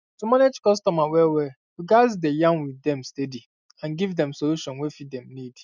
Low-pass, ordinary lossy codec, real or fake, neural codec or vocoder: 7.2 kHz; none; real; none